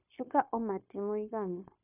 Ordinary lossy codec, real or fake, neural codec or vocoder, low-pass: none; fake; codec, 16 kHz, 0.9 kbps, LongCat-Audio-Codec; 3.6 kHz